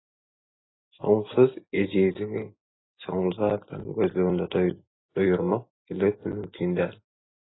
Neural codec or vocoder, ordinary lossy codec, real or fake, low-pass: none; AAC, 16 kbps; real; 7.2 kHz